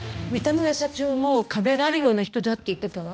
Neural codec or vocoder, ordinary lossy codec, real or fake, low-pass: codec, 16 kHz, 1 kbps, X-Codec, HuBERT features, trained on balanced general audio; none; fake; none